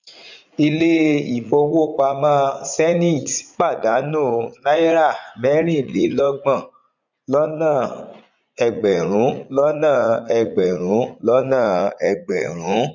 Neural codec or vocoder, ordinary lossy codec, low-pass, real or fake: vocoder, 44.1 kHz, 80 mel bands, Vocos; none; 7.2 kHz; fake